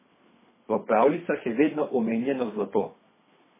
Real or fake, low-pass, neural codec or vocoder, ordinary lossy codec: fake; 3.6 kHz; codec, 24 kHz, 3 kbps, HILCodec; MP3, 16 kbps